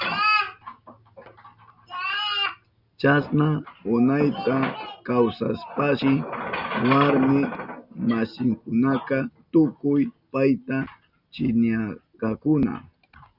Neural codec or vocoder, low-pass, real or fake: none; 5.4 kHz; real